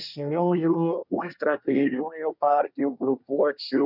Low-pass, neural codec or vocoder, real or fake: 5.4 kHz; codec, 24 kHz, 1 kbps, SNAC; fake